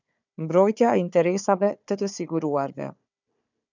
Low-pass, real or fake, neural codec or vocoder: 7.2 kHz; fake; codec, 16 kHz, 4 kbps, FunCodec, trained on Chinese and English, 50 frames a second